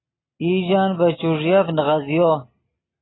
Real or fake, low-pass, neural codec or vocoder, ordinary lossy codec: real; 7.2 kHz; none; AAC, 16 kbps